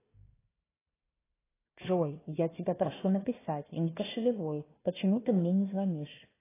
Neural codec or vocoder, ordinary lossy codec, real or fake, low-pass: codec, 16 kHz, 1 kbps, FunCodec, trained on Chinese and English, 50 frames a second; AAC, 16 kbps; fake; 3.6 kHz